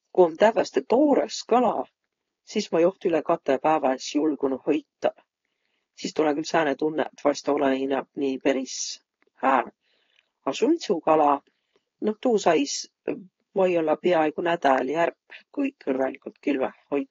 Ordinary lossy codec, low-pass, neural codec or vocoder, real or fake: AAC, 32 kbps; 7.2 kHz; codec, 16 kHz, 4.8 kbps, FACodec; fake